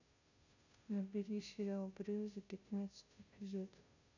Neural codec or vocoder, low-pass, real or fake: codec, 16 kHz, 0.5 kbps, FunCodec, trained on Chinese and English, 25 frames a second; 7.2 kHz; fake